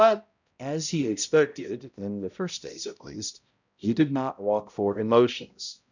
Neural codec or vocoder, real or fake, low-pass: codec, 16 kHz, 0.5 kbps, X-Codec, HuBERT features, trained on balanced general audio; fake; 7.2 kHz